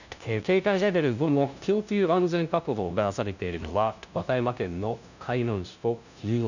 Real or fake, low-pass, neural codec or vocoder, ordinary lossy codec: fake; 7.2 kHz; codec, 16 kHz, 0.5 kbps, FunCodec, trained on LibriTTS, 25 frames a second; none